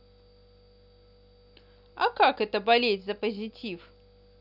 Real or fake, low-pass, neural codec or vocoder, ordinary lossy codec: real; 5.4 kHz; none; none